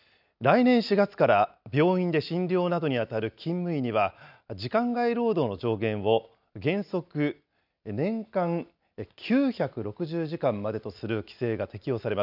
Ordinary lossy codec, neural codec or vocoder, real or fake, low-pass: none; none; real; 5.4 kHz